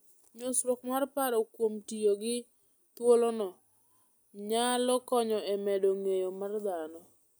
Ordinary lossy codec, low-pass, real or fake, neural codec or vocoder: none; none; real; none